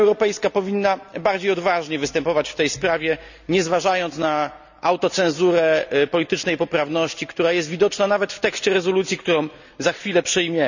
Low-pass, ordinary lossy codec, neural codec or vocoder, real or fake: 7.2 kHz; none; none; real